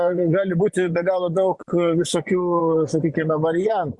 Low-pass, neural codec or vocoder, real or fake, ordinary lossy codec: 10.8 kHz; vocoder, 24 kHz, 100 mel bands, Vocos; fake; Opus, 64 kbps